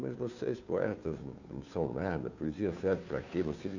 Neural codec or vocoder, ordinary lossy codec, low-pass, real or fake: codec, 16 kHz, 2 kbps, FunCodec, trained on Chinese and English, 25 frames a second; none; 7.2 kHz; fake